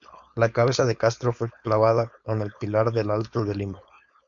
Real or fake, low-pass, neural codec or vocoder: fake; 7.2 kHz; codec, 16 kHz, 4.8 kbps, FACodec